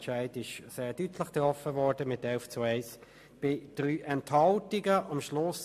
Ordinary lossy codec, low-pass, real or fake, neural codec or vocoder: MP3, 64 kbps; 14.4 kHz; real; none